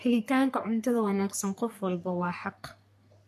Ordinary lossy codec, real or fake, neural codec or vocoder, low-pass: MP3, 64 kbps; fake; codec, 44.1 kHz, 3.4 kbps, Pupu-Codec; 14.4 kHz